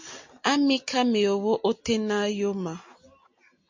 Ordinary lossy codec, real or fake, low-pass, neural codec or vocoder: MP3, 48 kbps; real; 7.2 kHz; none